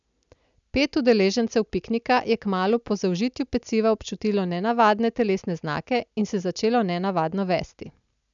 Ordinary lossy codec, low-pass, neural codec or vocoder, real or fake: none; 7.2 kHz; none; real